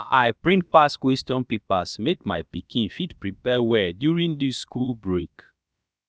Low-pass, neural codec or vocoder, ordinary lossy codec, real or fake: none; codec, 16 kHz, about 1 kbps, DyCAST, with the encoder's durations; none; fake